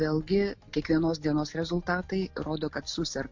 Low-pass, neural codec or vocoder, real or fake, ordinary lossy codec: 7.2 kHz; none; real; MP3, 48 kbps